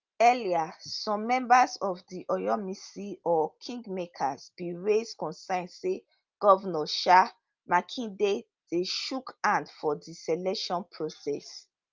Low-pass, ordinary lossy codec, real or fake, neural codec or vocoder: 7.2 kHz; Opus, 32 kbps; real; none